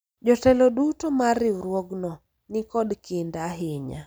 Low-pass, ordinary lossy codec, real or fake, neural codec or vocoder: none; none; real; none